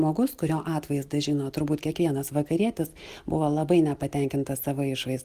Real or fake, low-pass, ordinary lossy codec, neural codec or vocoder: real; 14.4 kHz; Opus, 24 kbps; none